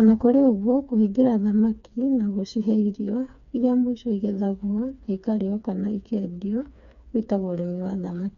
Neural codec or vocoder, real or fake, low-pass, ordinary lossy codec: codec, 16 kHz, 4 kbps, FreqCodec, smaller model; fake; 7.2 kHz; none